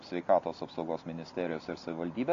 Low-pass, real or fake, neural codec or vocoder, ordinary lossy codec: 7.2 kHz; real; none; AAC, 48 kbps